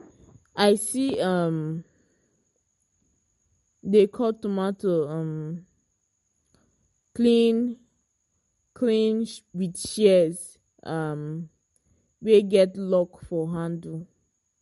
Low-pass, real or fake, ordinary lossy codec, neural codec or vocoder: 19.8 kHz; real; MP3, 48 kbps; none